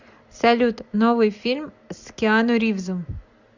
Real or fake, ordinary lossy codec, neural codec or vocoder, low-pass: real; Opus, 64 kbps; none; 7.2 kHz